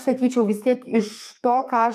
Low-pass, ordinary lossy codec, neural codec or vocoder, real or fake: 14.4 kHz; MP3, 96 kbps; codec, 32 kHz, 1.9 kbps, SNAC; fake